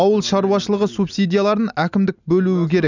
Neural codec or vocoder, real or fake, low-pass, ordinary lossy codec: none; real; 7.2 kHz; none